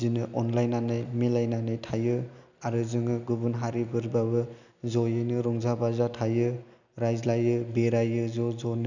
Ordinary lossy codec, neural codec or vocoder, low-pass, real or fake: none; none; 7.2 kHz; real